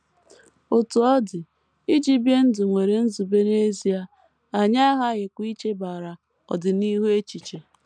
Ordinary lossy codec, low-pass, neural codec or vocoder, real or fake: none; 9.9 kHz; none; real